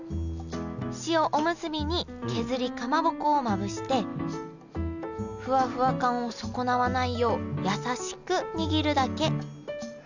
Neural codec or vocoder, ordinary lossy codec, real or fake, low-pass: none; none; real; 7.2 kHz